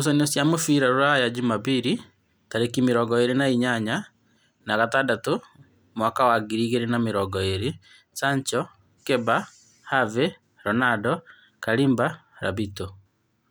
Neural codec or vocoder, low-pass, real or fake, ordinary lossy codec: none; none; real; none